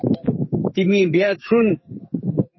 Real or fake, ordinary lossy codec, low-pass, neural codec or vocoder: fake; MP3, 24 kbps; 7.2 kHz; codec, 44.1 kHz, 2.6 kbps, SNAC